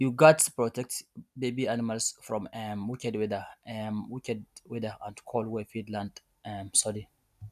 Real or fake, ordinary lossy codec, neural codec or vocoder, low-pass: real; none; none; 14.4 kHz